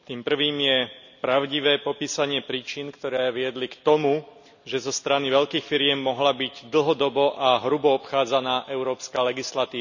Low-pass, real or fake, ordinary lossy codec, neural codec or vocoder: 7.2 kHz; real; none; none